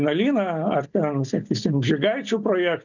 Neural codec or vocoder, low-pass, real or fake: none; 7.2 kHz; real